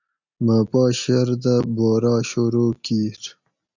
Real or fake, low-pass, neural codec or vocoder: real; 7.2 kHz; none